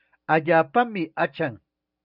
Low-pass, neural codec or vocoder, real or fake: 5.4 kHz; none; real